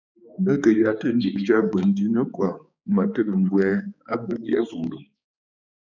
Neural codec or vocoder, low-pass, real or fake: codec, 16 kHz, 4 kbps, X-Codec, HuBERT features, trained on balanced general audio; 7.2 kHz; fake